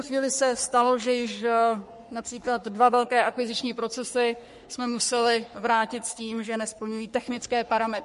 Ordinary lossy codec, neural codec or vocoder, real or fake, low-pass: MP3, 48 kbps; codec, 44.1 kHz, 3.4 kbps, Pupu-Codec; fake; 14.4 kHz